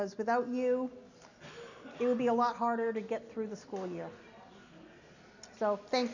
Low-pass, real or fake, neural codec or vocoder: 7.2 kHz; real; none